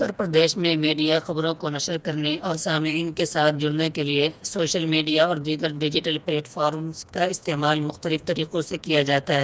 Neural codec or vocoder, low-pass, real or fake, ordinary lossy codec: codec, 16 kHz, 2 kbps, FreqCodec, smaller model; none; fake; none